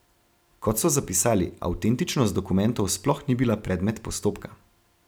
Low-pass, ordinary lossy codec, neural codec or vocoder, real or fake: none; none; none; real